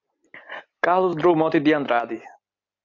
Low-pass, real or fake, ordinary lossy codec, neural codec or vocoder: 7.2 kHz; real; MP3, 48 kbps; none